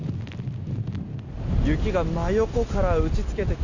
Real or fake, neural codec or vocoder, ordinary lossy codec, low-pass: real; none; none; 7.2 kHz